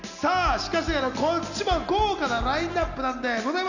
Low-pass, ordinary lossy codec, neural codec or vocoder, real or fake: 7.2 kHz; none; none; real